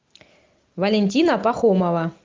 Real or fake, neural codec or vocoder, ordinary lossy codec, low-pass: real; none; Opus, 32 kbps; 7.2 kHz